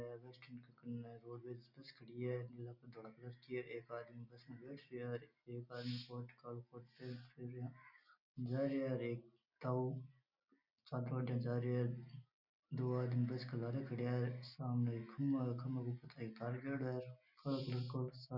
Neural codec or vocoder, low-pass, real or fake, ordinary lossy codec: none; 5.4 kHz; real; none